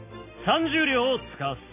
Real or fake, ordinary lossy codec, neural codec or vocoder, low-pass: real; none; none; 3.6 kHz